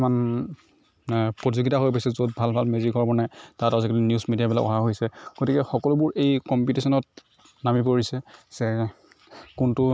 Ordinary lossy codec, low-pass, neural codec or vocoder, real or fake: none; none; none; real